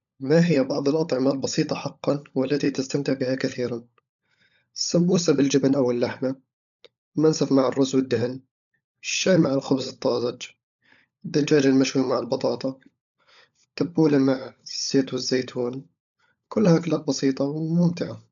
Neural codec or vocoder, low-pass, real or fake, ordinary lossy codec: codec, 16 kHz, 16 kbps, FunCodec, trained on LibriTTS, 50 frames a second; 7.2 kHz; fake; none